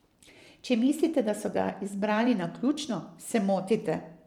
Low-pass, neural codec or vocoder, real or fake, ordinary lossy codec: 19.8 kHz; vocoder, 44.1 kHz, 128 mel bands every 512 samples, BigVGAN v2; fake; MP3, 96 kbps